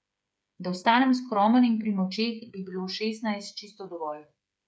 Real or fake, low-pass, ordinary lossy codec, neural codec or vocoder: fake; none; none; codec, 16 kHz, 8 kbps, FreqCodec, smaller model